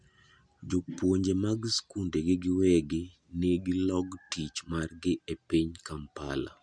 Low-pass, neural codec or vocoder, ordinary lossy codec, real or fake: 9.9 kHz; none; none; real